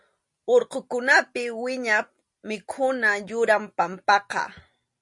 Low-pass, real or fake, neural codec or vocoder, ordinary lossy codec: 10.8 kHz; real; none; MP3, 48 kbps